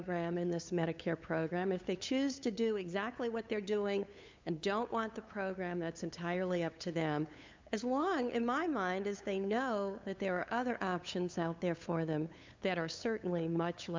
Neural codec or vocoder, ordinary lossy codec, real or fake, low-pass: codec, 16 kHz, 8 kbps, FunCodec, trained on LibriTTS, 25 frames a second; MP3, 64 kbps; fake; 7.2 kHz